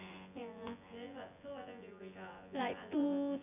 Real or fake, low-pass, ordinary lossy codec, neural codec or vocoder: fake; 3.6 kHz; none; vocoder, 24 kHz, 100 mel bands, Vocos